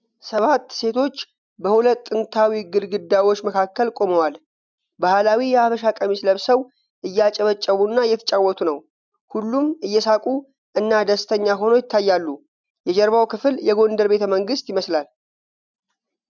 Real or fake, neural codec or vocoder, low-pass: real; none; 7.2 kHz